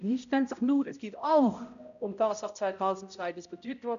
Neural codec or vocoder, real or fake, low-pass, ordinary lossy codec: codec, 16 kHz, 0.5 kbps, X-Codec, HuBERT features, trained on balanced general audio; fake; 7.2 kHz; none